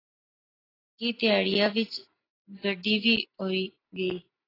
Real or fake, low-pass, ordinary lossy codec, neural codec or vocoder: real; 5.4 kHz; AAC, 24 kbps; none